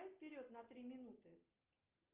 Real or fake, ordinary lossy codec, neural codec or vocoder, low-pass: real; Opus, 24 kbps; none; 3.6 kHz